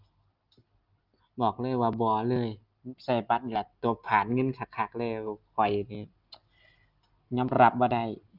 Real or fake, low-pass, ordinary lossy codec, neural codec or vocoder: real; 5.4 kHz; Opus, 24 kbps; none